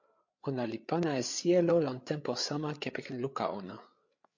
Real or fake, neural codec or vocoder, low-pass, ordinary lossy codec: fake; codec, 16 kHz, 8 kbps, FreqCodec, larger model; 7.2 kHz; MP3, 48 kbps